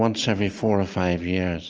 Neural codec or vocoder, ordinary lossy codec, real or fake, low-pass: none; Opus, 24 kbps; real; 7.2 kHz